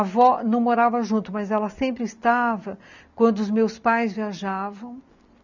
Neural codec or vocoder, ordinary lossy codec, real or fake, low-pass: none; none; real; 7.2 kHz